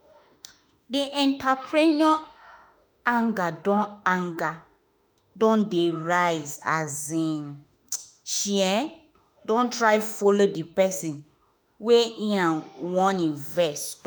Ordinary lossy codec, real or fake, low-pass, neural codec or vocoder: none; fake; none; autoencoder, 48 kHz, 32 numbers a frame, DAC-VAE, trained on Japanese speech